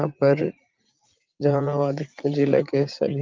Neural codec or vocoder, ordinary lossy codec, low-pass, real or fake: vocoder, 44.1 kHz, 80 mel bands, Vocos; Opus, 32 kbps; 7.2 kHz; fake